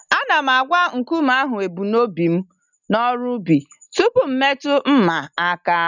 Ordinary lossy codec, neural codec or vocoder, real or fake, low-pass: none; none; real; 7.2 kHz